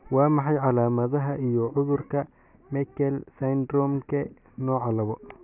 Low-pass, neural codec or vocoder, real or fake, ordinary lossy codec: 3.6 kHz; none; real; none